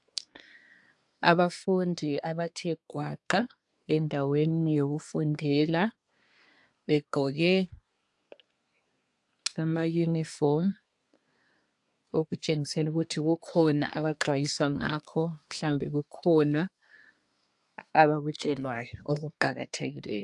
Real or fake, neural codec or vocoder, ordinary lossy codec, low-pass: fake; codec, 24 kHz, 1 kbps, SNAC; AAC, 64 kbps; 10.8 kHz